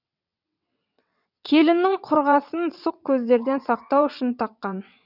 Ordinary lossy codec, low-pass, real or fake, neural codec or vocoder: none; 5.4 kHz; fake; vocoder, 22.05 kHz, 80 mel bands, WaveNeXt